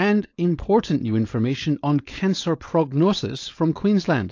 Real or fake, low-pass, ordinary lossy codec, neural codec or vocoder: fake; 7.2 kHz; AAC, 48 kbps; codec, 16 kHz, 4.8 kbps, FACodec